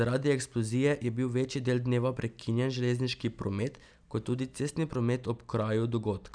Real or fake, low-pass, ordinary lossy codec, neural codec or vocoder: real; 9.9 kHz; none; none